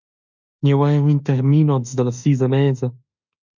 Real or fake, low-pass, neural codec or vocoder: fake; 7.2 kHz; autoencoder, 48 kHz, 32 numbers a frame, DAC-VAE, trained on Japanese speech